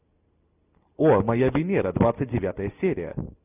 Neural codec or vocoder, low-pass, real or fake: none; 3.6 kHz; real